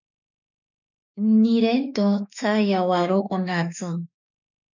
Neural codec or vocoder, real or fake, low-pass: autoencoder, 48 kHz, 32 numbers a frame, DAC-VAE, trained on Japanese speech; fake; 7.2 kHz